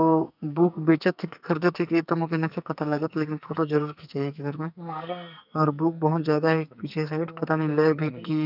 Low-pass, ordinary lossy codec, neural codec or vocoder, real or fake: 5.4 kHz; none; codec, 44.1 kHz, 2.6 kbps, SNAC; fake